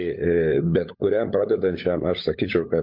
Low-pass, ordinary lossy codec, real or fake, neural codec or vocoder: 5.4 kHz; MP3, 48 kbps; fake; codec, 16 kHz, 16 kbps, FunCodec, trained on LibriTTS, 50 frames a second